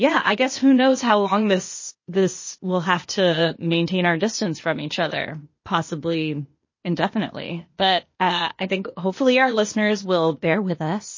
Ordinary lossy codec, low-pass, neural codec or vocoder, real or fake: MP3, 32 kbps; 7.2 kHz; codec, 16 kHz, 0.8 kbps, ZipCodec; fake